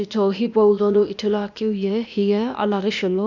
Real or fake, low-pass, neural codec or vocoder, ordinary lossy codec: fake; 7.2 kHz; codec, 16 kHz, 0.8 kbps, ZipCodec; none